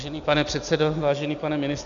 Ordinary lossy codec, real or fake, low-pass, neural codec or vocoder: MP3, 96 kbps; real; 7.2 kHz; none